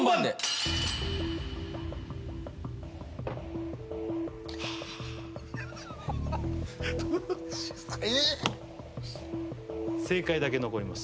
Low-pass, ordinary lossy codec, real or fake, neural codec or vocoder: none; none; real; none